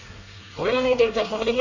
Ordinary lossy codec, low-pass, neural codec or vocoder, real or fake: none; 7.2 kHz; codec, 24 kHz, 1 kbps, SNAC; fake